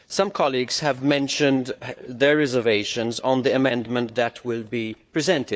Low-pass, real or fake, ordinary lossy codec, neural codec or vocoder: none; fake; none; codec, 16 kHz, 4 kbps, FunCodec, trained on Chinese and English, 50 frames a second